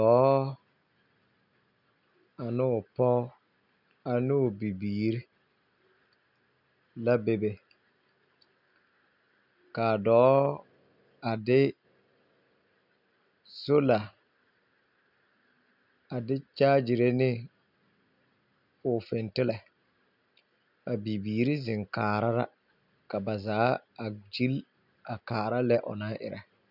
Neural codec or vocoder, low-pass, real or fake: none; 5.4 kHz; real